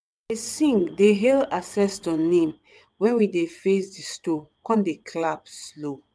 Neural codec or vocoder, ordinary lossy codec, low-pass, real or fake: vocoder, 22.05 kHz, 80 mel bands, WaveNeXt; none; none; fake